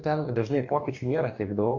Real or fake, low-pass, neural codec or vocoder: fake; 7.2 kHz; codec, 44.1 kHz, 2.6 kbps, DAC